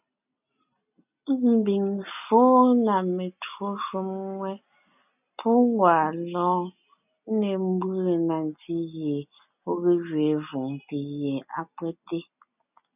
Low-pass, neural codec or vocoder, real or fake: 3.6 kHz; none; real